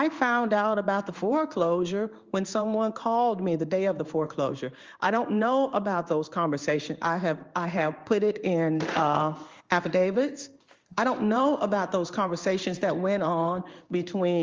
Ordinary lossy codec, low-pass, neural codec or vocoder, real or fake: Opus, 32 kbps; 7.2 kHz; codec, 16 kHz in and 24 kHz out, 1 kbps, XY-Tokenizer; fake